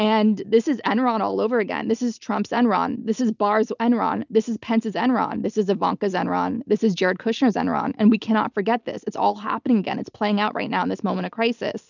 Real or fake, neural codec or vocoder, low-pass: real; none; 7.2 kHz